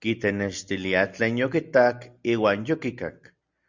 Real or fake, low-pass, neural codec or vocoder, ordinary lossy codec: real; 7.2 kHz; none; Opus, 64 kbps